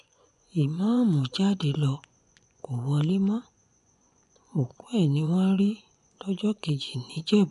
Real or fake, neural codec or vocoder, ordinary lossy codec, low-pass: fake; vocoder, 24 kHz, 100 mel bands, Vocos; none; 10.8 kHz